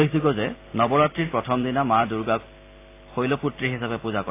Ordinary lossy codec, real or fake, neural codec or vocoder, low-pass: AAC, 24 kbps; real; none; 3.6 kHz